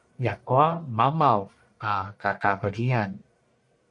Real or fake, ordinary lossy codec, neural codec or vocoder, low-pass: fake; AAC, 64 kbps; codec, 44.1 kHz, 1.7 kbps, Pupu-Codec; 10.8 kHz